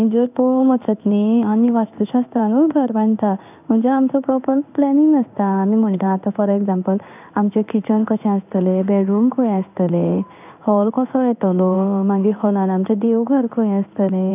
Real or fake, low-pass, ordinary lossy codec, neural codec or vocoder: fake; 3.6 kHz; none; codec, 16 kHz in and 24 kHz out, 1 kbps, XY-Tokenizer